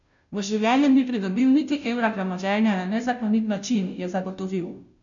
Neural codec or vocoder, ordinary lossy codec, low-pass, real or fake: codec, 16 kHz, 0.5 kbps, FunCodec, trained on Chinese and English, 25 frames a second; none; 7.2 kHz; fake